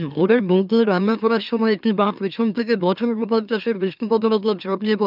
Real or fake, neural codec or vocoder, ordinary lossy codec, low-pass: fake; autoencoder, 44.1 kHz, a latent of 192 numbers a frame, MeloTTS; none; 5.4 kHz